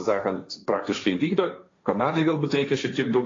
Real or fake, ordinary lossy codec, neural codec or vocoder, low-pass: fake; AAC, 32 kbps; codec, 16 kHz, 1.1 kbps, Voila-Tokenizer; 7.2 kHz